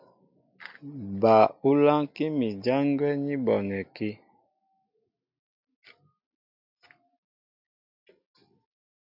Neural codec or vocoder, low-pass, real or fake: none; 5.4 kHz; real